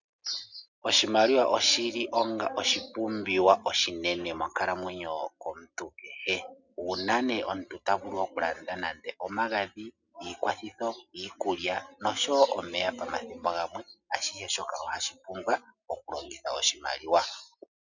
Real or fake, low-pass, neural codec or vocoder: real; 7.2 kHz; none